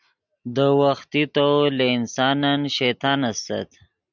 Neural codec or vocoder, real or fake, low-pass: none; real; 7.2 kHz